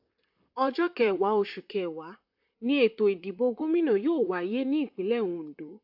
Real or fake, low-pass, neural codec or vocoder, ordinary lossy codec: fake; 5.4 kHz; vocoder, 44.1 kHz, 128 mel bands, Pupu-Vocoder; AAC, 48 kbps